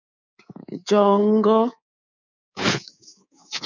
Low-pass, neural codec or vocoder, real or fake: 7.2 kHz; codec, 24 kHz, 3.1 kbps, DualCodec; fake